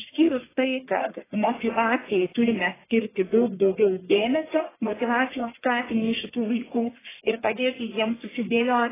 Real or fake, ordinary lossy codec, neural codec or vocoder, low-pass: fake; AAC, 16 kbps; codec, 44.1 kHz, 1.7 kbps, Pupu-Codec; 3.6 kHz